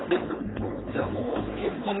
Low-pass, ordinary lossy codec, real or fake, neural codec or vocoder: 7.2 kHz; AAC, 16 kbps; fake; codec, 16 kHz, 4.8 kbps, FACodec